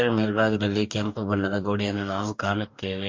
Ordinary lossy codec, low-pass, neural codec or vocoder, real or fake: MP3, 48 kbps; 7.2 kHz; codec, 44.1 kHz, 2.6 kbps, DAC; fake